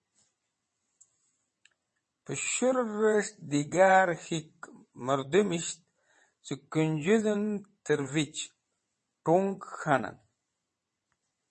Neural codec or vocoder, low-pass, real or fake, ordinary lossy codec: vocoder, 22.05 kHz, 80 mel bands, WaveNeXt; 9.9 kHz; fake; MP3, 32 kbps